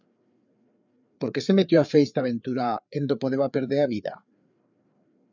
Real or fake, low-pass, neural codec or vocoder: fake; 7.2 kHz; codec, 16 kHz, 4 kbps, FreqCodec, larger model